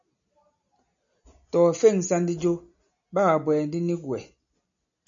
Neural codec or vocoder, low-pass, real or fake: none; 7.2 kHz; real